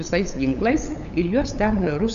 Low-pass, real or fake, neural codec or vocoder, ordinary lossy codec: 7.2 kHz; fake; codec, 16 kHz, 8 kbps, FunCodec, trained on LibriTTS, 25 frames a second; AAC, 96 kbps